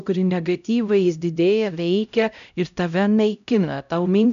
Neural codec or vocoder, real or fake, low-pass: codec, 16 kHz, 0.5 kbps, X-Codec, HuBERT features, trained on LibriSpeech; fake; 7.2 kHz